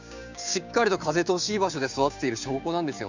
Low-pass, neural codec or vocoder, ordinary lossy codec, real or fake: 7.2 kHz; codec, 16 kHz, 6 kbps, DAC; none; fake